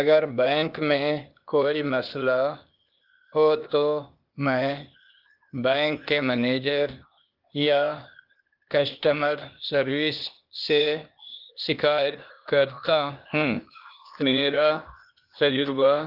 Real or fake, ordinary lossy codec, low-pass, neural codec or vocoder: fake; Opus, 32 kbps; 5.4 kHz; codec, 16 kHz, 0.8 kbps, ZipCodec